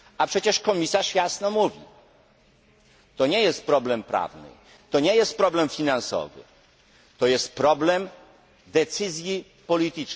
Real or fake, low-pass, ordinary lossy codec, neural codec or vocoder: real; none; none; none